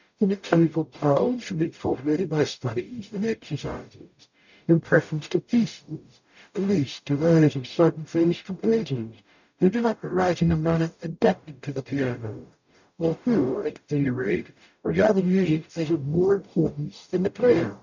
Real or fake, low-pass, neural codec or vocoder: fake; 7.2 kHz; codec, 44.1 kHz, 0.9 kbps, DAC